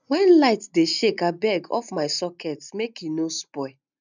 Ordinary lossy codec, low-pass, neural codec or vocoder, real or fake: none; 7.2 kHz; none; real